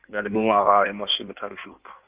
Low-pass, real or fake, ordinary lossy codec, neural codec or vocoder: 3.6 kHz; fake; Opus, 32 kbps; codec, 16 kHz in and 24 kHz out, 1.1 kbps, FireRedTTS-2 codec